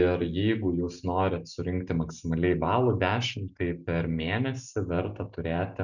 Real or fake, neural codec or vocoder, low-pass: real; none; 7.2 kHz